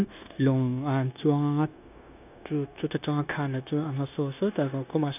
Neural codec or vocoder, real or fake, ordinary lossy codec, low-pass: codec, 16 kHz, 0.9 kbps, LongCat-Audio-Codec; fake; none; 3.6 kHz